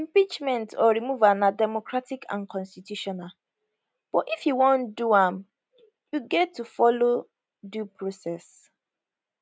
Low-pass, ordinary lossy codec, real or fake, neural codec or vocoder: none; none; real; none